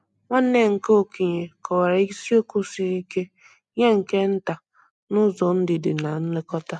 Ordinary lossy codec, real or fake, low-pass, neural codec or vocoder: none; real; 10.8 kHz; none